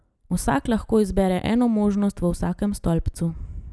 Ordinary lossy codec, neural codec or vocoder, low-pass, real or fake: none; none; none; real